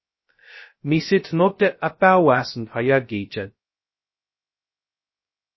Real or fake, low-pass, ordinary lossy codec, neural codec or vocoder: fake; 7.2 kHz; MP3, 24 kbps; codec, 16 kHz, 0.2 kbps, FocalCodec